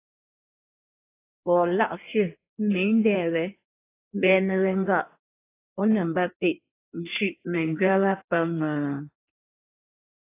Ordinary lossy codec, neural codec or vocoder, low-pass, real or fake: AAC, 24 kbps; codec, 16 kHz in and 24 kHz out, 1.1 kbps, FireRedTTS-2 codec; 3.6 kHz; fake